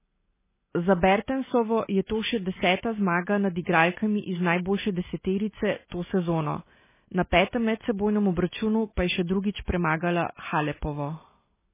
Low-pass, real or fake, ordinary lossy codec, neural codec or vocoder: 3.6 kHz; real; MP3, 16 kbps; none